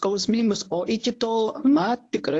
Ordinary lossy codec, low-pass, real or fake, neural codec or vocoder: AAC, 48 kbps; 10.8 kHz; fake; codec, 24 kHz, 0.9 kbps, WavTokenizer, medium speech release version 1